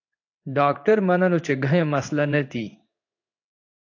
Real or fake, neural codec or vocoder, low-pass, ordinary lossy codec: fake; codec, 16 kHz in and 24 kHz out, 1 kbps, XY-Tokenizer; 7.2 kHz; AAC, 48 kbps